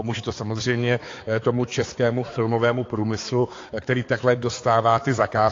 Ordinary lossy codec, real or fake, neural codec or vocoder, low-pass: AAC, 32 kbps; fake; codec, 16 kHz, 4 kbps, X-Codec, HuBERT features, trained on balanced general audio; 7.2 kHz